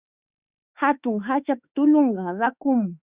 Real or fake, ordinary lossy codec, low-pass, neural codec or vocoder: fake; Opus, 64 kbps; 3.6 kHz; autoencoder, 48 kHz, 32 numbers a frame, DAC-VAE, trained on Japanese speech